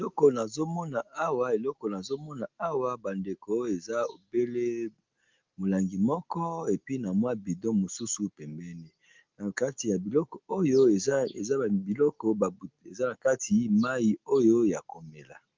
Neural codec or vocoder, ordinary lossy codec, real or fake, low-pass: none; Opus, 32 kbps; real; 7.2 kHz